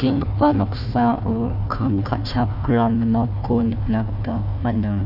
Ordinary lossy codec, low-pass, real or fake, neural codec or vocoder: none; 5.4 kHz; fake; codec, 16 kHz, 1 kbps, FunCodec, trained on Chinese and English, 50 frames a second